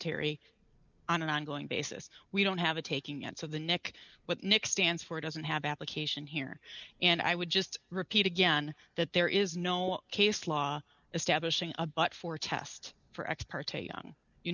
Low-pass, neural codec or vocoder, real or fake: 7.2 kHz; none; real